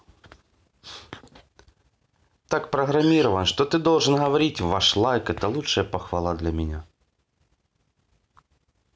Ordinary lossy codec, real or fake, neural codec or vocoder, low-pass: none; real; none; none